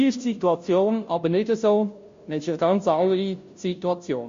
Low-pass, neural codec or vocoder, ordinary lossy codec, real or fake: 7.2 kHz; codec, 16 kHz, 0.5 kbps, FunCodec, trained on Chinese and English, 25 frames a second; MP3, 48 kbps; fake